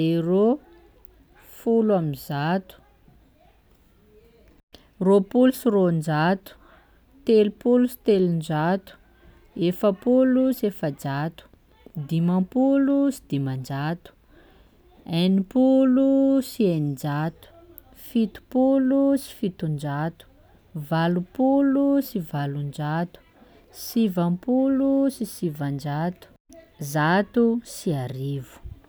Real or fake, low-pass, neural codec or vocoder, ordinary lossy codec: real; none; none; none